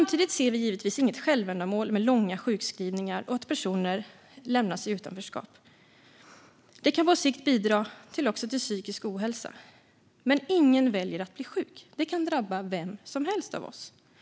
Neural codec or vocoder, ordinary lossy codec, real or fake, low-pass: none; none; real; none